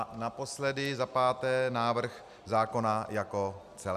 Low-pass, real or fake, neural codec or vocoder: 14.4 kHz; real; none